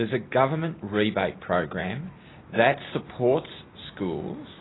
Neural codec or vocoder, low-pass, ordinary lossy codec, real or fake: none; 7.2 kHz; AAC, 16 kbps; real